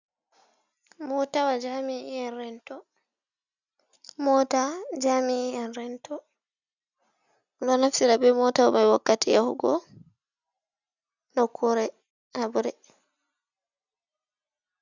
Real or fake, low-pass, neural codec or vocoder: real; 7.2 kHz; none